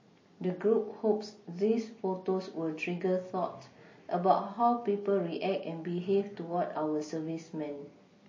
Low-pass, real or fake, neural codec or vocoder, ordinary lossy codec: 7.2 kHz; real; none; MP3, 32 kbps